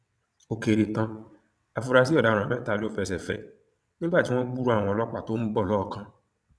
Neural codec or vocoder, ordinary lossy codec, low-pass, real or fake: vocoder, 22.05 kHz, 80 mel bands, WaveNeXt; none; none; fake